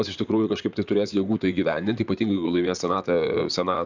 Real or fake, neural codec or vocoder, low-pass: fake; vocoder, 44.1 kHz, 128 mel bands, Pupu-Vocoder; 7.2 kHz